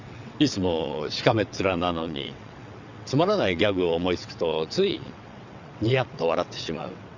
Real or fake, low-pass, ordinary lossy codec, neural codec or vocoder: fake; 7.2 kHz; none; vocoder, 22.05 kHz, 80 mel bands, WaveNeXt